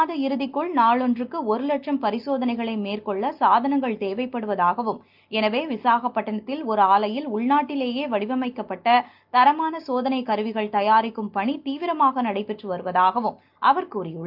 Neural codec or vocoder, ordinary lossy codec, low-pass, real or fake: none; Opus, 24 kbps; 5.4 kHz; real